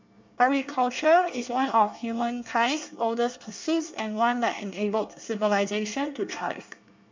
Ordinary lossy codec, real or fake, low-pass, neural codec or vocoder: MP3, 64 kbps; fake; 7.2 kHz; codec, 24 kHz, 1 kbps, SNAC